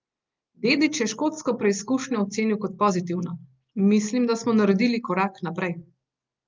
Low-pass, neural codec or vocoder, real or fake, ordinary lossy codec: 7.2 kHz; none; real; Opus, 24 kbps